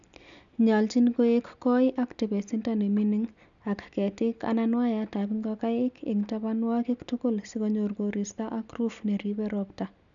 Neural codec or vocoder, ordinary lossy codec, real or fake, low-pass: none; none; real; 7.2 kHz